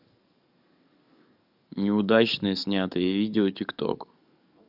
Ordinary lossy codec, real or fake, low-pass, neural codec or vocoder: none; fake; 5.4 kHz; codec, 44.1 kHz, 7.8 kbps, DAC